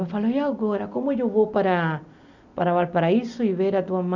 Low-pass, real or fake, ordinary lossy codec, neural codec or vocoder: 7.2 kHz; real; none; none